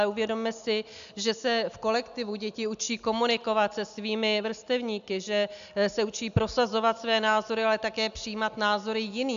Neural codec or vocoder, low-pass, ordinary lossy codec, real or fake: none; 7.2 kHz; AAC, 96 kbps; real